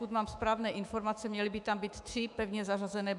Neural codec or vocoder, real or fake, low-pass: autoencoder, 48 kHz, 128 numbers a frame, DAC-VAE, trained on Japanese speech; fake; 10.8 kHz